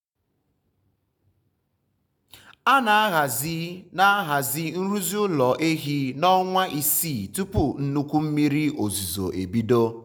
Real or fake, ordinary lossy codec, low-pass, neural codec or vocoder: real; none; none; none